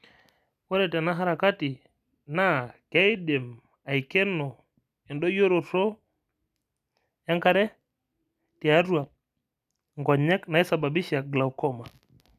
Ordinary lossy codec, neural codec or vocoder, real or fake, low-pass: none; none; real; 14.4 kHz